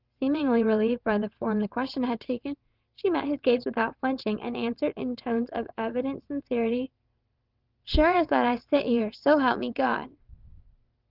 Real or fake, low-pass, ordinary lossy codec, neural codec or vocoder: fake; 5.4 kHz; Opus, 32 kbps; vocoder, 22.05 kHz, 80 mel bands, WaveNeXt